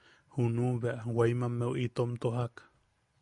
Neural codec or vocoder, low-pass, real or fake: none; 10.8 kHz; real